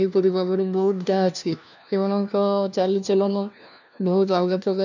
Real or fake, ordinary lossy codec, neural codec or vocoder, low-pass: fake; none; codec, 16 kHz, 1 kbps, FunCodec, trained on LibriTTS, 50 frames a second; 7.2 kHz